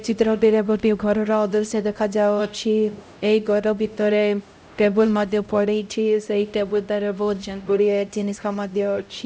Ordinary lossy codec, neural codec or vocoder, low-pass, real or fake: none; codec, 16 kHz, 0.5 kbps, X-Codec, HuBERT features, trained on LibriSpeech; none; fake